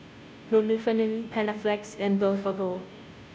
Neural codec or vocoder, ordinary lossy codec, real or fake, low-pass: codec, 16 kHz, 0.5 kbps, FunCodec, trained on Chinese and English, 25 frames a second; none; fake; none